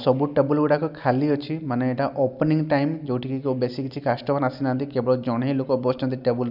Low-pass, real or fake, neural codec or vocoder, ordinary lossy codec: 5.4 kHz; real; none; none